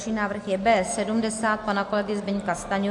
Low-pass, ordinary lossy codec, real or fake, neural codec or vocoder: 10.8 kHz; AAC, 64 kbps; real; none